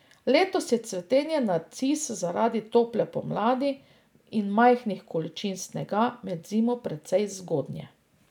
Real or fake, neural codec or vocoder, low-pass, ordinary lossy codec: real; none; 19.8 kHz; none